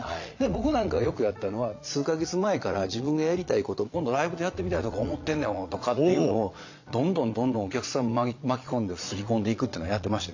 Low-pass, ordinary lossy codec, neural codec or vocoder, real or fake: 7.2 kHz; AAC, 48 kbps; vocoder, 22.05 kHz, 80 mel bands, Vocos; fake